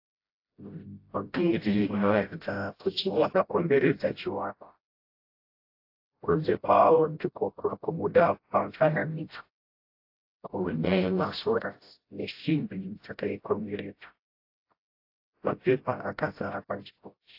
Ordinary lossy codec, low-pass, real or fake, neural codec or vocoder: AAC, 32 kbps; 5.4 kHz; fake; codec, 16 kHz, 0.5 kbps, FreqCodec, smaller model